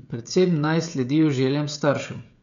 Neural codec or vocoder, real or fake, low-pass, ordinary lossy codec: codec, 16 kHz, 16 kbps, FreqCodec, smaller model; fake; 7.2 kHz; none